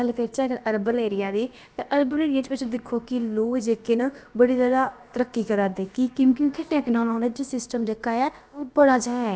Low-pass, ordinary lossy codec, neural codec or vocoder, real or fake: none; none; codec, 16 kHz, about 1 kbps, DyCAST, with the encoder's durations; fake